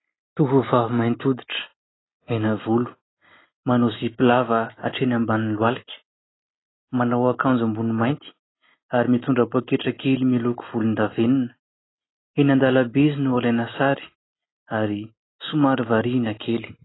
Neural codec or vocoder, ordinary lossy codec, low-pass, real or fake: none; AAC, 16 kbps; 7.2 kHz; real